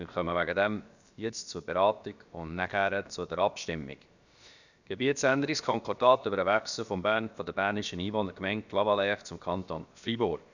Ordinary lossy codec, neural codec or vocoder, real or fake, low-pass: none; codec, 16 kHz, about 1 kbps, DyCAST, with the encoder's durations; fake; 7.2 kHz